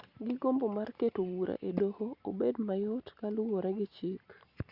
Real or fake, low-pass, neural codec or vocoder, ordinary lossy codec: real; 5.4 kHz; none; Opus, 64 kbps